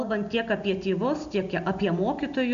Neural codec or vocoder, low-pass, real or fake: none; 7.2 kHz; real